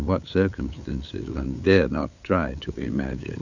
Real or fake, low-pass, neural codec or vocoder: fake; 7.2 kHz; codec, 16 kHz, 8 kbps, FunCodec, trained on LibriTTS, 25 frames a second